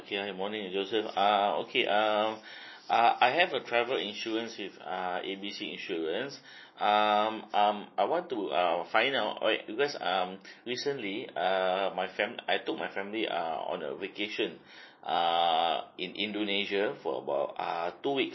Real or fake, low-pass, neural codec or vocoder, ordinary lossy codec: real; 7.2 kHz; none; MP3, 24 kbps